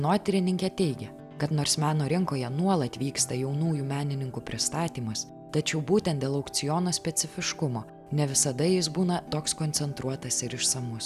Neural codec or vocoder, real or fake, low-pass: none; real; 14.4 kHz